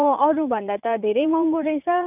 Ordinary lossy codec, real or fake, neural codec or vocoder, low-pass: none; fake; vocoder, 44.1 kHz, 80 mel bands, Vocos; 3.6 kHz